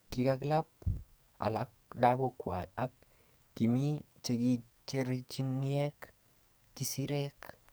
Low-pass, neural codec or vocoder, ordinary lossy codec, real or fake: none; codec, 44.1 kHz, 2.6 kbps, SNAC; none; fake